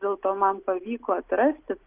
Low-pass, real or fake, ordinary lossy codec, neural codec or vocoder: 3.6 kHz; real; Opus, 32 kbps; none